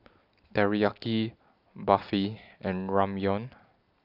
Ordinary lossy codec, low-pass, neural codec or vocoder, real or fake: none; 5.4 kHz; none; real